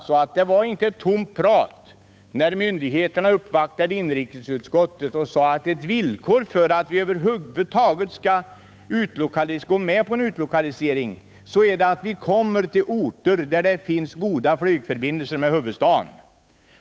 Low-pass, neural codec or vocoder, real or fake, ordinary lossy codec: none; codec, 16 kHz, 8 kbps, FunCodec, trained on Chinese and English, 25 frames a second; fake; none